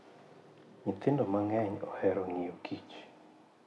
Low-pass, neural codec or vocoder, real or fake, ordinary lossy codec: none; none; real; none